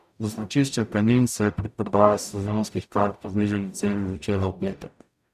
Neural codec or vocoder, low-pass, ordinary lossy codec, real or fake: codec, 44.1 kHz, 0.9 kbps, DAC; 14.4 kHz; none; fake